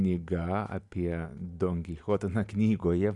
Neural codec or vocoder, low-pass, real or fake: none; 10.8 kHz; real